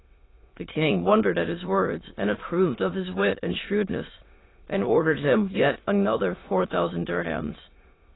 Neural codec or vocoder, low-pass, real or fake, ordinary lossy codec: autoencoder, 22.05 kHz, a latent of 192 numbers a frame, VITS, trained on many speakers; 7.2 kHz; fake; AAC, 16 kbps